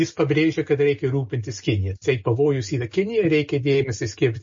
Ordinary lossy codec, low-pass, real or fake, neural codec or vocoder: MP3, 32 kbps; 7.2 kHz; real; none